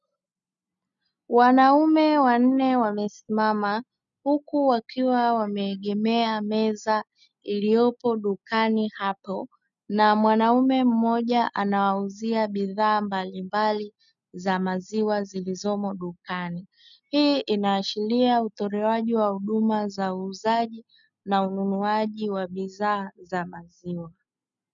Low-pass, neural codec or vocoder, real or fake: 7.2 kHz; none; real